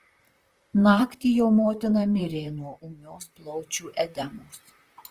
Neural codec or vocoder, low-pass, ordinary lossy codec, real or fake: vocoder, 44.1 kHz, 128 mel bands, Pupu-Vocoder; 14.4 kHz; Opus, 24 kbps; fake